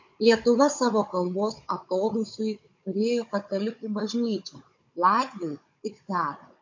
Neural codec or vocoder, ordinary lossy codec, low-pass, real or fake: codec, 16 kHz, 16 kbps, FunCodec, trained on Chinese and English, 50 frames a second; MP3, 48 kbps; 7.2 kHz; fake